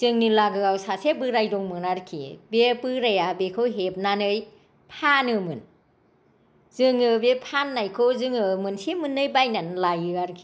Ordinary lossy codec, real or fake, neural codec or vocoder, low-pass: none; real; none; none